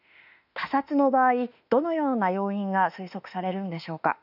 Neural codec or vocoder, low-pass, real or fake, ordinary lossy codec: autoencoder, 48 kHz, 32 numbers a frame, DAC-VAE, trained on Japanese speech; 5.4 kHz; fake; none